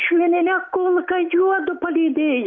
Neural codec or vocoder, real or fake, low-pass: none; real; 7.2 kHz